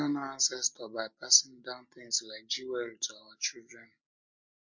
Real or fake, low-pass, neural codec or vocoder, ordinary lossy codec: real; 7.2 kHz; none; MP3, 48 kbps